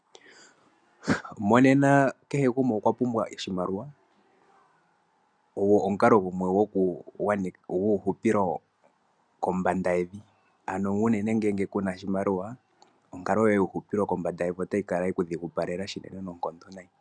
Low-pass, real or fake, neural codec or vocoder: 9.9 kHz; real; none